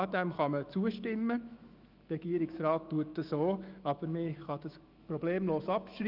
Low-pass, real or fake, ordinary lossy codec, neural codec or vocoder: 5.4 kHz; fake; Opus, 24 kbps; autoencoder, 48 kHz, 128 numbers a frame, DAC-VAE, trained on Japanese speech